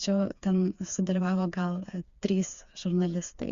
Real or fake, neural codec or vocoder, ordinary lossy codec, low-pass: fake; codec, 16 kHz, 4 kbps, FreqCodec, smaller model; Opus, 64 kbps; 7.2 kHz